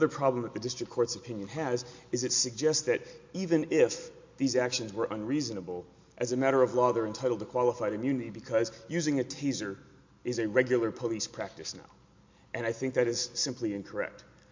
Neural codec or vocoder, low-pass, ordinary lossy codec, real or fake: none; 7.2 kHz; MP3, 48 kbps; real